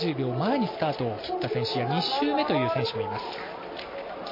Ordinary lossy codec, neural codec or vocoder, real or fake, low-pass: MP3, 24 kbps; none; real; 5.4 kHz